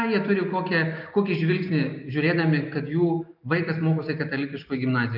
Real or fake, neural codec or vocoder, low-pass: real; none; 5.4 kHz